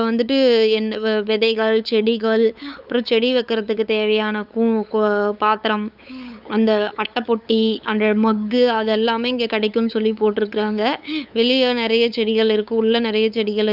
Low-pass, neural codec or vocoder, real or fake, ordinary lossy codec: 5.4 kHz; codec, 16 kHz, 8 kbps, FunCodec, trained on LibriTTS, 25 frames a second; fake; none